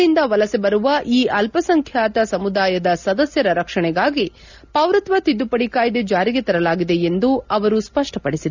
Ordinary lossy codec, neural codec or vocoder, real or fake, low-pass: none; none; real; 7.2 kHz